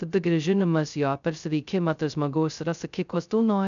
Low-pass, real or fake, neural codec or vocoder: 7.2 kHz; fake; codec, 16 kHz, 0.2 kbps, FocalCodec